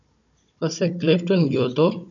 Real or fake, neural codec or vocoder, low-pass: fake; codec, 16 kHz, 16 kbps, FunCodec, trained on Chinese and English, 50 frames a second; 7.2 kHz